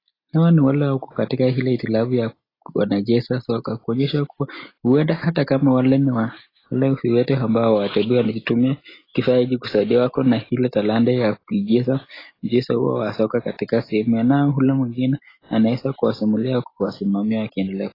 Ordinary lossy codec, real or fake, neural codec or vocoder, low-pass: AAC, 24 kbps; real; none; 5.4 kHz